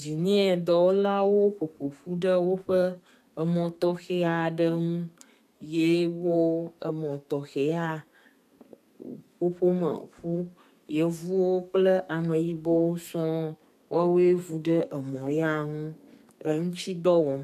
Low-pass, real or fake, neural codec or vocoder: 14.4 kHz; fake; codec, 32 kHz, 1.9 kbps, SNAC